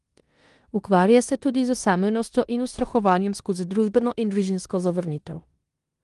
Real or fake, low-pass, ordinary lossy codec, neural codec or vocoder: fake; 10.8 kHz; Opus, 24 kbps; codec, 16 kHz in and 24 kHz out, 0.9 kbps, LongCat-Audio-Codec, four codebook decoder